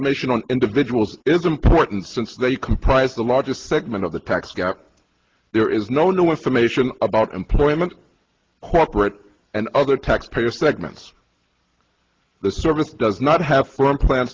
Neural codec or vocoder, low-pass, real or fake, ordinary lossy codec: none; 7.2 kHz; real; Opus, 16 kbps